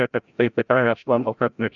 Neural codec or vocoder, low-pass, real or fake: codec, 16 kHz, 0.5 kbps, FreqCodec, larger model; 7.2 kHz; fake